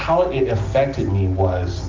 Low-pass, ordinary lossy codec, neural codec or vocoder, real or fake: 7.2 kHz; Opus, 24 kbps; none; real